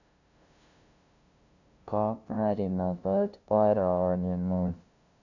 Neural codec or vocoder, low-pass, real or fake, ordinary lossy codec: codec, 16 kHz, 0.5 kbps, FunCodec, trained on LibriTTS, 25 frames a second; 7.2 kHz; fake; none